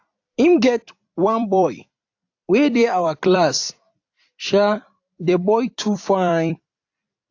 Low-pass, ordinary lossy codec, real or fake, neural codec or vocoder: 7.2 kHz; AAC, 48 kbps; fake; vocoder, 44.1 kHz, 128 mel bands every 256 samples, BigVGAN v2